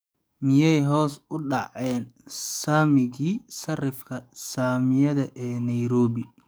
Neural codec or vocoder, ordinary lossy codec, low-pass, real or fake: codec, 44.1 kHz, 7.8 kbps, DAC; none; none; fake